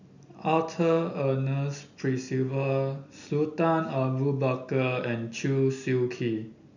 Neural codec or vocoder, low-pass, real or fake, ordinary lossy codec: none; 7.2 kHz; real; none